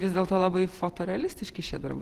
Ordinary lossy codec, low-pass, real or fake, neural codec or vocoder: Opus, 16 kbps; 14.4 kHz; fake; vocoder, 48 kHz, 128 mel bands, Vocos